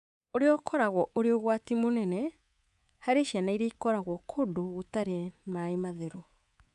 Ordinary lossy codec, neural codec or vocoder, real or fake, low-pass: none; codec, 24 kHz, 3.1 kbps, DualCodec; fake; 10.8 kHz